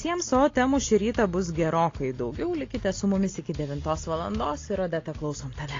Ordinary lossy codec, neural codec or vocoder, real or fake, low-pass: AAC, 32 kbps; none; real; 7.2 kHz